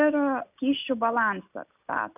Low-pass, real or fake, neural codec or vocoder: 3.6 kHz; real; none